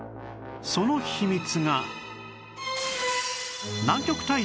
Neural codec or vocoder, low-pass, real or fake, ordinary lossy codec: none; none; real; none